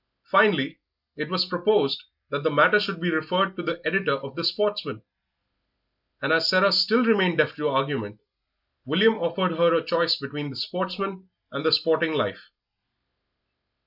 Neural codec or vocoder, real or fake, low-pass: none; real; 5.4 kHz